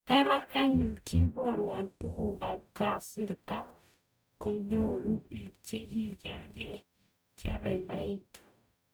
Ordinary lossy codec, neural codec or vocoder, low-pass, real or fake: none; codec, 44.1 kHz, 0.9 kbps, DAC; none; fake